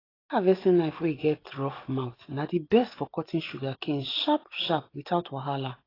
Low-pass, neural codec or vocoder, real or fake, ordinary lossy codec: 5.4 kHz; none; real; AAC, 24 kbps